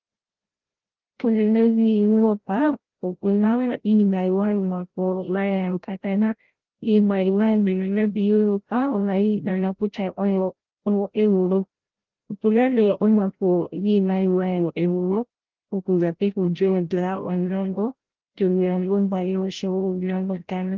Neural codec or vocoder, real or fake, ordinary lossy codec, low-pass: codec, 16 kHz, 0.5 kbps, FreqCodec, larger model; fake; Opus, 16 kbps; 7.2 kHz